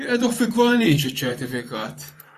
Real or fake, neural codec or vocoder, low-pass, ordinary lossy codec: fake; vocoder, 44.1 kHz, 128 mel bands, Pupu-Vocoder; 14.4 kHz; AAC, 96 kbps